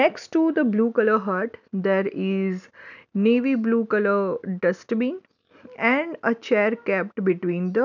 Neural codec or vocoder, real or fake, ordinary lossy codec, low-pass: none; real; none; 7.2 kHz